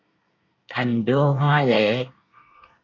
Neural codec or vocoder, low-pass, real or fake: codec, 24 kHz, 1 kbps, SNAC; 7.2 kHz; fake